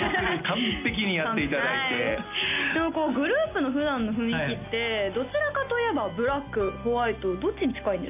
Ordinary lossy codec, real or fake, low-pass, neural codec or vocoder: AAC, 32 kbps; real; 3.6 kHz; none